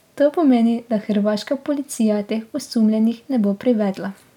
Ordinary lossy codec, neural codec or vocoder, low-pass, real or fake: none; none; 19.8 kHz; real